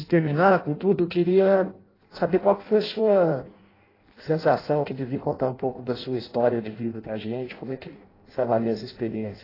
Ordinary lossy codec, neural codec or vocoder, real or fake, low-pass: AAC, 24 kbps; codec, 16 kHz in and 24 kHz out, 0.6 kbps, FireRedTTS-2 codec; fake; 5.4 kHz